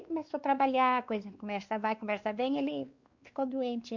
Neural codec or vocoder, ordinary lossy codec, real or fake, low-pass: codec, 16 kHz, 2 kbps, X-Codec, WavLM features, trained on Multilingual LibriSpeech; Opus, 64 kbps; fake; 7.2 kHz